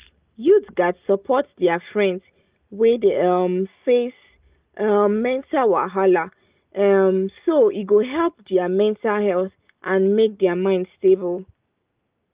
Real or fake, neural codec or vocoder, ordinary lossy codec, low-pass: real; none; Opus, 32 kbps; 3.6 kHz